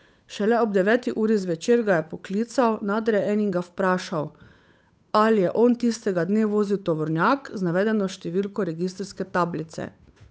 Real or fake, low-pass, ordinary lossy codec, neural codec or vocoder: fake; none; none; codec, 16 kHz, 8 kbps, FunCodec, trained on Chinese and English, 25 frames a second